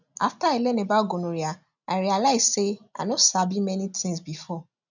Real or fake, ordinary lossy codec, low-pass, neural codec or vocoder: real; none; 7.2 kHz; none